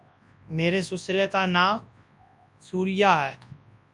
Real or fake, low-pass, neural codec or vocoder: fake; 10.8 kHz; codec, 24 kHz, 0.9 kbps, WavTokenizer, large speech release